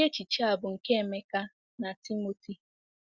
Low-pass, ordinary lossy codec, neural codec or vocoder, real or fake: 7.2 kHz; Opus, 64 kbps; none; real